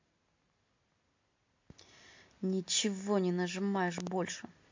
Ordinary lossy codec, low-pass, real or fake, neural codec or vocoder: MP3, 48 kbps; 7.2 kHz; real; none